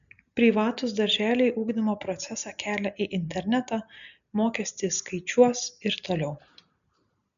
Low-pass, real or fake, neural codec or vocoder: 7.2 kHz; real; none